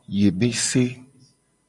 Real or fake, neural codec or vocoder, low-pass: real; none; 10.8 kHz